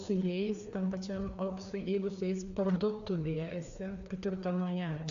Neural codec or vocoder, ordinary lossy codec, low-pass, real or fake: codec, 16 kHz, 2 kbps, FreqCodec, larger model; AAC, 96 kbps; 7.2 kHz; fake